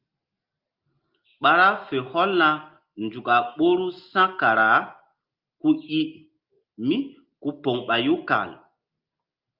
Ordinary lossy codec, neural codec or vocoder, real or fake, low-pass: Opus, 24 kbps; none; real; 5.4 kHz